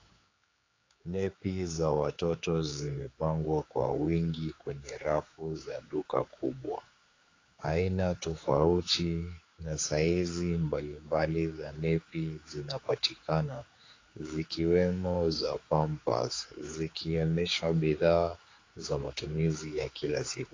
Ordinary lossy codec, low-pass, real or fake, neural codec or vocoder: AAC, 32 kbps; 7.2 kHz; fake; codec, 16 kHz, 4 kbps, X-Codec, HuBERT features, trained on general audio